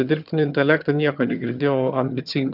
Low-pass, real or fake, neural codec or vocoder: 5.4 kHz; fake; vocoder, 22.05 kHz, 80 mel bands, HiFi-GAN